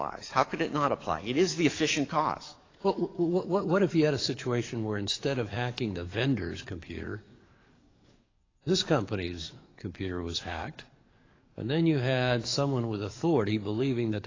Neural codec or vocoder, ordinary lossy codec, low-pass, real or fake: codec, 44.1 kHz, 7.8 kbps, DAC; AAC, 32 kbps; 7.2 kHz; fake